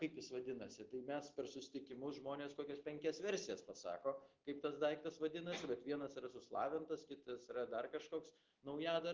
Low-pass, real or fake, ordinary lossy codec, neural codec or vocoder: 7.2 kHz; real; Opus, 16 kbps; none